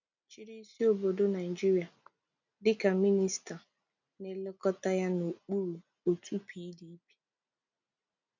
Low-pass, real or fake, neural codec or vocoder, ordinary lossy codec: 7.2 kHz; real; none; none